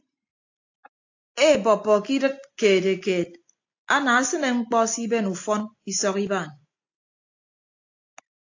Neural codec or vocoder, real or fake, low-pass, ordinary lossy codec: none; real; 7.2 kHz; AAC, 48 kbps